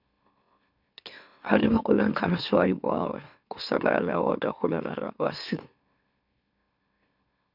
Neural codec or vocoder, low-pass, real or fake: autoencoder, 44.1 kHz, a latent of 192 numbers a frame, MeloTTS; 5.4 kHz; fake